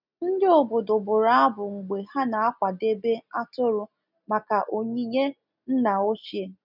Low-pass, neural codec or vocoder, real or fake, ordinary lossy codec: 5.4 kHz; none; real; none